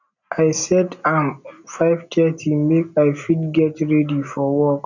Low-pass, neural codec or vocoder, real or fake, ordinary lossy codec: 7.2 kHz; none; real; none